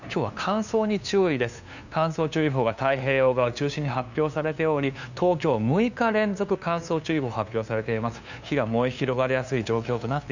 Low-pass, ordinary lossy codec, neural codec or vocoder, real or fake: 7.2 kHz; none; codec, 16 kHz, 2 kbps, FunCodec, trained on LibriTTS, 25 frames a second; fake